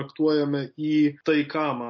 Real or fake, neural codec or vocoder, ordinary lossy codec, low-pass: real; none; MP3, 32 kbps; 7.2 kHz